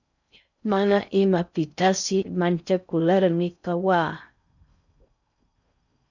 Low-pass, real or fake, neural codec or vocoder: 7.2 kHz; fake; codec, 16 kHz in and 24 kHz out, 0.6 kbps, FocalCodec, streaming, 4096 codes